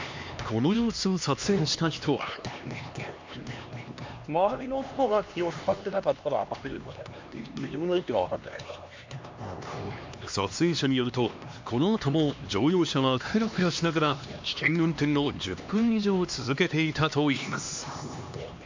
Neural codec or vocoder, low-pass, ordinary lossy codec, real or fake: codec, 16 kHz, 2 kbps, X-Codec, HuBERT features, trained on LibriSpeech; 7.2 kHz; MP3, 64 kbps; fake